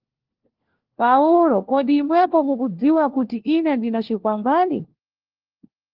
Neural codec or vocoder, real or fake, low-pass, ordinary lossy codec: codec, 16 kHz, 1 kbps, FunCodec, trained on LibriTTS, 50 frames a second; fake; 5.4 kHz; Opus, 16 kbps